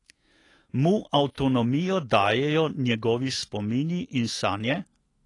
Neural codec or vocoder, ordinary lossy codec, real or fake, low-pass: autoencoder, 48 kHz, 128 numbers a frame, DAC-VAE, trained on Japanese speech; AAC, 32 kbps; fake; 10.8 kHz